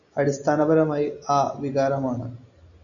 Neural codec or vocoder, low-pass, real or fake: none; 7.2 kHz; real